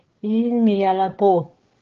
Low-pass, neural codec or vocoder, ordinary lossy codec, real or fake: 7.2 kHz; codec, 16 kHz, 8 kbps, FreqCodec, larger model; Opus, 16 kbps; fake